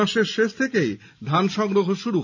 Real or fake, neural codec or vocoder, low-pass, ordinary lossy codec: real; none; 7.2 kHz; none